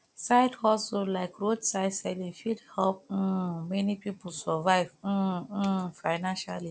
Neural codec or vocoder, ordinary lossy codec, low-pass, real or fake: none; none; none; real